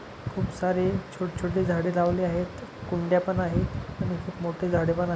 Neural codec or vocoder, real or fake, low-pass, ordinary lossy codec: none; real; none; none